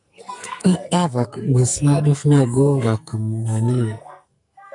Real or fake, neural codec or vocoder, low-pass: fake; codec, 44.1 kHz, 2.6 kbps, SNAC; 10.8 kHz